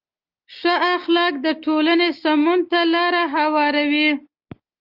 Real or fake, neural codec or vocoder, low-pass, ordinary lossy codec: real; none; 5.4 kHz; Opus, 24 kbps